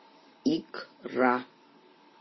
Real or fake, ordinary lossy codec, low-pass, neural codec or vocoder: real; MP3, 24 kbps; 7.2 kHz; none